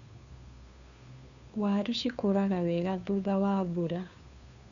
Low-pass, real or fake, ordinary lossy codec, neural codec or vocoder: 7.2 kHz; fake; none; codec, 16 kHz, 2 kbps, FunCodec, trained on Chinese and English, 25 frames a second